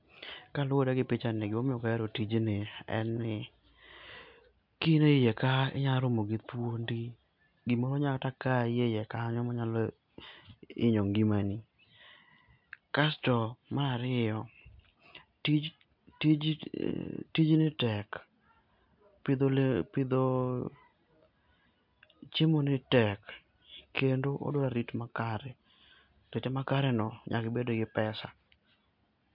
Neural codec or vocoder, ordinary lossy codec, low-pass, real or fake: none; MP3, 48 kbps; 5.4 kHz; real